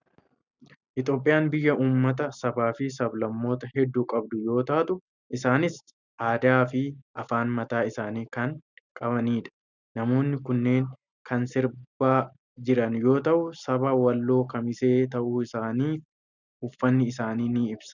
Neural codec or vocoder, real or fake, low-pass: none; real; 7.2 kHz